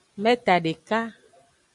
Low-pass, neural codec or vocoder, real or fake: 10.8 kHz; none; real